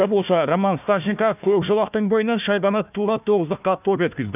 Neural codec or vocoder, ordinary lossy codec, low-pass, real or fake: codec, 16 kHz, 1 kbps, FunCodec, trained on Chinese and English, 50 frames a second; none; 3.6 kHz; fake